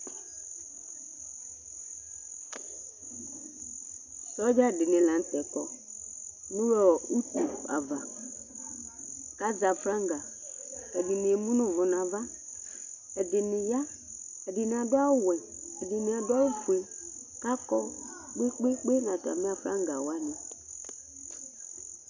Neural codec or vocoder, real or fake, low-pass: none; real; 7.2 kHz